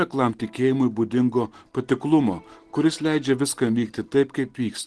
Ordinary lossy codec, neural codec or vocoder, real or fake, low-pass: Opus, 16 kbps; none; real; 10.8 kHz